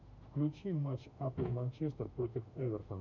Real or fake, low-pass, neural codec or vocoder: fake; 7.2 kHz; autoencoder, 48 kHz, 32 numbers a frame, DAC-VAE, trained on Japanese speech